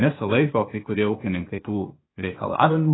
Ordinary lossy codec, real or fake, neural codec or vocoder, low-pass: AAC, 16 kbps; fake; codec, 16 kHz, 0.8 kbps, ZipCodec; 7.2 kHz